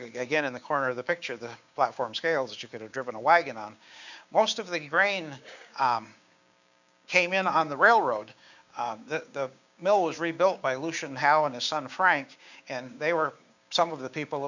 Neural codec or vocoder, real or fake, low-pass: codec, 16 kHz, 6 kbps, DAC; fake; 7.2 kHz